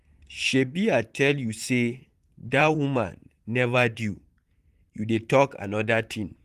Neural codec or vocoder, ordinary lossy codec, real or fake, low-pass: vocoder, 44.1 kHz, 128 mel bands, Pupu-Vocoder; Opus, 24 kbps; fake; 14.4 kHz